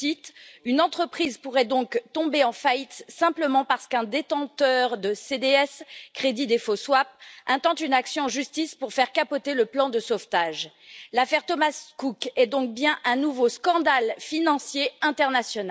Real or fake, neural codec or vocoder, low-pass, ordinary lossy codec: real; none; none; none